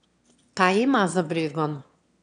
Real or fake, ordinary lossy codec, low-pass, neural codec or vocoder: fake; none; 9.9 kHz; autoencoder, 22.05 kHz, a latent of 192 numbers a frame, VITS, trained on one speaker